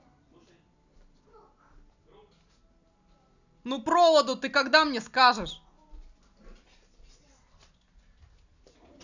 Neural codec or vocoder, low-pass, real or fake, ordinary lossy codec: none; 7.2 kHz; real; none